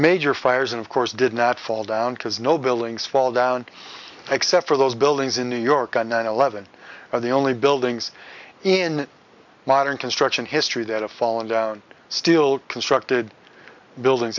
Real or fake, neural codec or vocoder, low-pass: real; none; 7.2 kHz